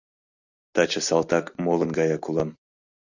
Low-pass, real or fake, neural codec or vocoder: 7.2 kHz; real; none